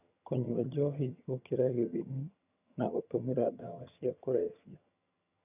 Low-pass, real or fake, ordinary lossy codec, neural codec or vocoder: 3.6 kHz; fake; none; vocoder, 22.05 kHz, 80 mel bands, HiFi-GAN